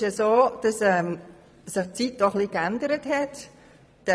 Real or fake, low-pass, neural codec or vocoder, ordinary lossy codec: fake; none; vocoder, 22.05 kHz, 80 mel bands, Vocos; none